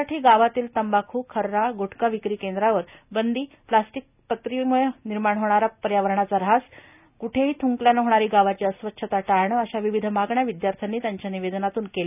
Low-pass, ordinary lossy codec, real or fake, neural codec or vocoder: 3.6 kHz; none; real; none